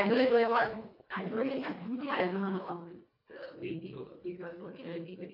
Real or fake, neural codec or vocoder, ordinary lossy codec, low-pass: fake; codec, 24 kHz, 1.5 kbps, HILCodec; MP3, 32 kbps; 5.4 kHz